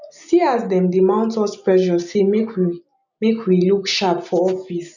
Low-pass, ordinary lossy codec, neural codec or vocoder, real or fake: 7.2 kHz; none; none; real